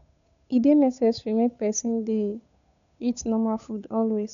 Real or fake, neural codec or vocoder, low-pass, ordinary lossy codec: fake; codec, 16 kHz, 8 kbps, FunCodec, trained on Chinese and English, 25 frames a second; 7.2 kHz; none